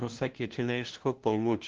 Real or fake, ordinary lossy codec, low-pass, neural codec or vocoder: fake; Opus, 16 kbps; 7.2 kHz; codec, 16 kHz, 0.5 kbps, FunCodec, trained on LibriTTS, 25 frames a second